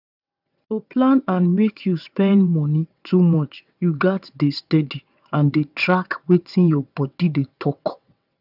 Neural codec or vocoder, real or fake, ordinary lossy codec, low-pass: vocoder, 22.05 kHz, 80 mel bands, WaveNeXt; fake; none; 5.4 kHz